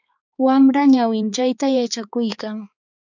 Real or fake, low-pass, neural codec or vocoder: fake; 7.2 kHz; codec, 16 kHz, 4 kbps, X-Codec, HuBERT features, trained on balanced general audio